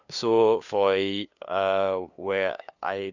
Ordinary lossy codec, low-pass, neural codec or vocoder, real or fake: none; 7.2 kHz; codec, 16 kHz, 2 kbps, FunCodec, trained on LibriTTS, 25 frames a second; fake